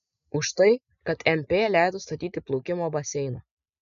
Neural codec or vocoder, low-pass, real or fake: none; 7.2 kHz; real